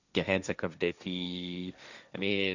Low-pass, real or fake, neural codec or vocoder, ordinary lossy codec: 7.2 kHz; fake; codec, 16 kHz, 1.1 kbps, Voila-Tokenizer; none